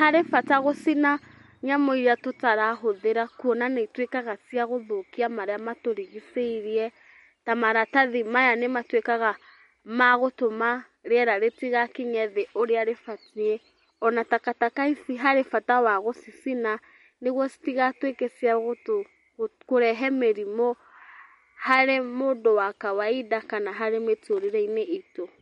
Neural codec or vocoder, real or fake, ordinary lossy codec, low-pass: none; real; MP3, 48 kbps; 19.8 kHz